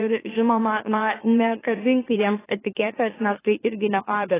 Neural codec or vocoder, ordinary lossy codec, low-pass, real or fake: autoencoder, 44.1 kHz, a latent of 192 numbers a frame, MeloTTS; AAC, 16 kbps; 3.6 kHz; fake